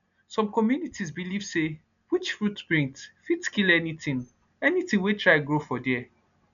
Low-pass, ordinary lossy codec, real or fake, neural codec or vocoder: 7.2 kHz; none; real; none